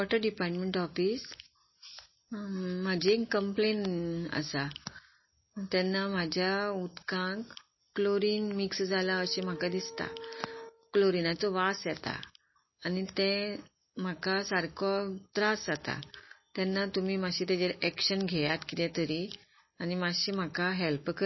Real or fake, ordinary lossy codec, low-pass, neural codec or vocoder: real; MP3, 24 kbps; 7.2 kHz; none